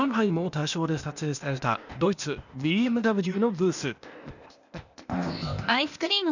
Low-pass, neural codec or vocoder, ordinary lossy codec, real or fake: 7.2 kHz; codec, 16 kHz, 0.8 kbps, ZipCodec; none; fake